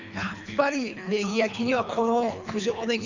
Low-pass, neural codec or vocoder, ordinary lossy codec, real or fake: 7.2 kHz; codec, 24 kHz, 3 kbps, HILCodec; none; fake